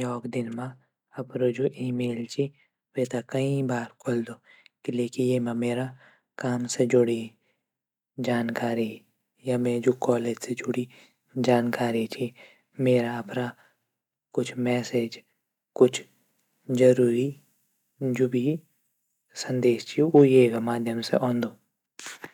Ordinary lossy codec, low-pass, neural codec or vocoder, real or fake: none; 19.8 kHz; none; real